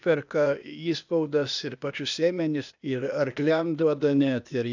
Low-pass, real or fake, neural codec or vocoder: 7.2 kHz; fake; codec, 16 kHz, 0.8 kbps, ZipCodec